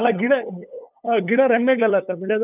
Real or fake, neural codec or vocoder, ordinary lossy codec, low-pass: fake; codec, 16 kHz, 8 kbps, FunCodec, trained on LibriTTS, 25 frames a second; none; 3.6 kHz